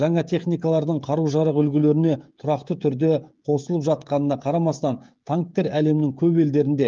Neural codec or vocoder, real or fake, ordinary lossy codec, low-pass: codec, 16 kHz, 16 kbps, FreqCodec, smaller model; fake; Opus, 32 kbps; 7.2 kHz